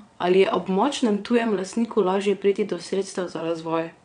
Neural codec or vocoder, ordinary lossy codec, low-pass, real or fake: vocoder, 22.05 kHz, 80 mel bands, WaveNeXt; none; 9.9 kHz; fake